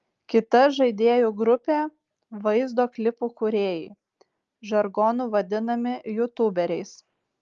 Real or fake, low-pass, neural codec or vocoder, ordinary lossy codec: real; 7.2 kHz; none; Opus, 32 kbps